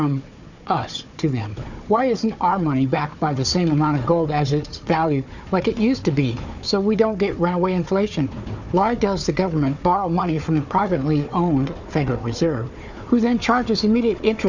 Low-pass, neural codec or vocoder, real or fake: 7.2 kHz; codec, 16 kHz, 4 kbps, FunCodec, trained on Chinese and English, 50 frames a second; fake